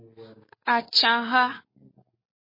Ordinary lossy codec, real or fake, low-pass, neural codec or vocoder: MP3, 24 kbps; real; 5.4 kHz; none